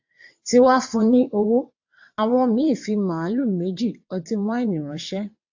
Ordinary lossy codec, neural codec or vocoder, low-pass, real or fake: AAC, 48 kbps; vocoder, 22.05 kHz, 80 mel bands, WaveNeXt; 7.2 kHz; fake